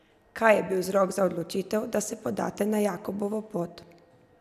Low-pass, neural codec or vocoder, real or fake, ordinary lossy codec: 14.4 kHz; none; real; none